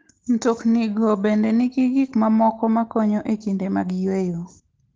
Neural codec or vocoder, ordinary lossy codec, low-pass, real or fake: none; Opus, 16 kbps; 7.2 kHz; real